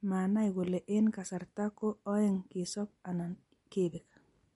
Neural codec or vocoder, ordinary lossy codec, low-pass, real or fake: none; MP3, 48 kbps; 19.8 kHz; real